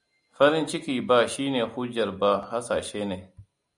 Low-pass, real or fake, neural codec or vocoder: 10.8 kHz; real; none